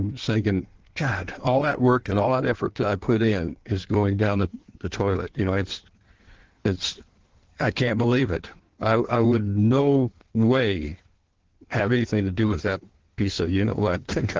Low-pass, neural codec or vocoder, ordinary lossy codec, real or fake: 7.2 kHz; codec, 16 kHz in and 24 kHz out, 1.1 kbps, FireRedTTS-2 codec; Opus, 16 kbps; fake